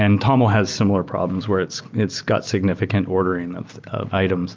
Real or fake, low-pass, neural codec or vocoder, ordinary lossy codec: real; 7.2 kHz; none; Opus, 24 kbps